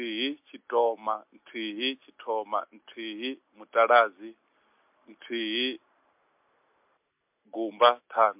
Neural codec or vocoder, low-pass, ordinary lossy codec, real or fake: none; 3.6 kHz; MP3, 32 kbps; real